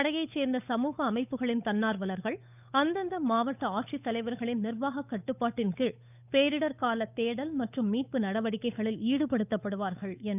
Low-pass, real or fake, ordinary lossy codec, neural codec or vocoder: 3.6 kHz; fake; none; codec, 16 kHz, 8 kbps, FunCodec, trained on Chinese and English, 25 frames a second